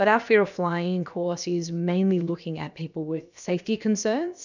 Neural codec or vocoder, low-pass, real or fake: codec, 16 kHz, about 1 kbps, DyCAST, with the encoder's durations; 7.2 kHz; fake